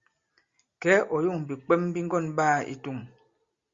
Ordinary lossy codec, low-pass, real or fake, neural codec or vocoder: Opus, 64 kbps; 7.2 kHz; real; none